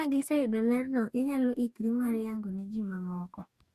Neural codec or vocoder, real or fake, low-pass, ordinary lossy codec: codec, 44.1 kHz, 2.6 kbps, DAC; fake; 14.4 kHz; Opus, 64 kbps